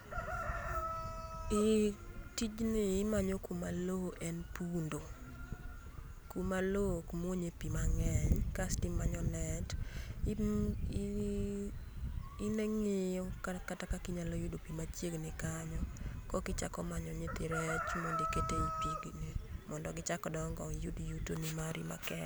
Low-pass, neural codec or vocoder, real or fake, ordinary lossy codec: none; none; real; none